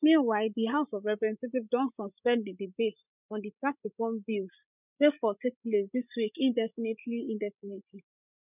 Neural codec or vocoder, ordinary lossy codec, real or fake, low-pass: codec, 16 kHz, 16 kbps, FreqCodec, larger model; none; fake; 3.6 kHz